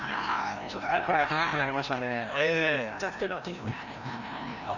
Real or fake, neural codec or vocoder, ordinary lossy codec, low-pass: fake; codec, 16 kHz, 1 kbps, FreqCodec, larger model; none; 7.2 kHz